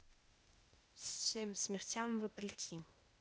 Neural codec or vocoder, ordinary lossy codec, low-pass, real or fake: codec, 16 kHz, 0.8 kbps, ZipCodec; none; none; fake